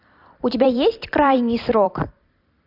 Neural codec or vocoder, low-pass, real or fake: vocoder, 44.1 kHz, 128 mel bands every 256 samples, BigVGAN v2; 5.4 kHz; fake